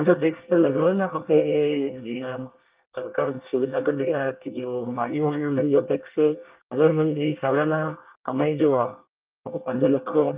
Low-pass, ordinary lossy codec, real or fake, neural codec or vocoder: 3.6 kHz; Opus, 24 kbps; fake; codec, 24 kHz, 1 kbps, SNAC